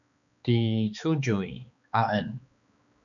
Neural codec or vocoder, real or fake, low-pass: codec, 16 kHz, 2 kbps, X-Codec, HuBERT features, trained on balanced general audio; fake; 7.2 kHz